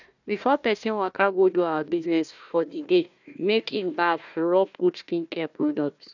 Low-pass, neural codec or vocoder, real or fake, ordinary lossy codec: 7.2 kHz; codec, 16 kHz, 1 kbps, FunCodec, trained on Chinese and English, 50 frames a second; fake; none